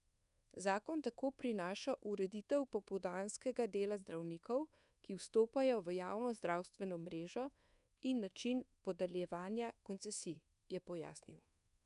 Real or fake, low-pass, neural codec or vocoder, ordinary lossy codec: fake; 10.8 kHz; codec, 24 kHz, 1.2 kbps, DualCodec; none